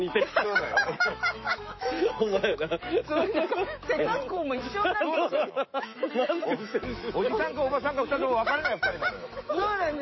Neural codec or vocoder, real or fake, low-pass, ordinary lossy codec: autoencoder, 48 kHz, 128 numbers a frame, DAC-VAE, trained on Japanese speech; fake; 7.2 kHz; MP3, 24 kbps